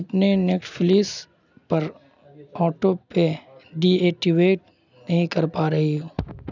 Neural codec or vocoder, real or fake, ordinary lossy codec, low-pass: none; real; none; 7.2 kHz